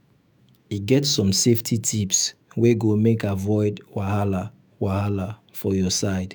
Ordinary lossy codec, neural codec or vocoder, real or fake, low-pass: none; autoencoder, 48 kHz, 128 numbers a frame, DAC-VAE, trained on Japanese speech; fake; none